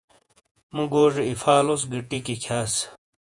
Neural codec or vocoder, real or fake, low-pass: vocoder, 48 kHz, 128 mel bands, Vocos; fake; 10.8 kHz